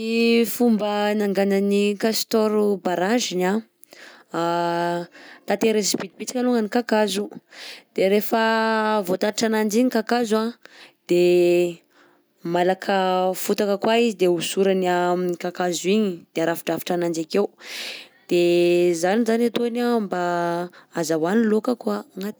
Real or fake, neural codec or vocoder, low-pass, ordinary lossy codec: real; none; none; none